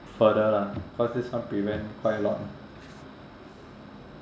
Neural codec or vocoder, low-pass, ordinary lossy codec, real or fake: none; none; none; real